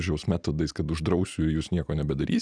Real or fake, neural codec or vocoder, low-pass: real; none; 9.9 kHz